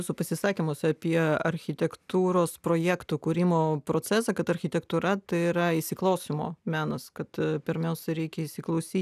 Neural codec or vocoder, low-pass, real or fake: none; 14.4 kHz; real